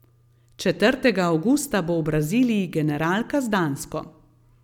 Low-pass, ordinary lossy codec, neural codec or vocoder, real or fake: 19.8 kHz; none; none; real